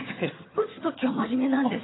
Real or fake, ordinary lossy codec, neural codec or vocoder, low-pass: fake; AAC, 16 kbps; vocoder, 22.05 kHz, 80 mel bands, HiFi-GAN; 7.2 kHz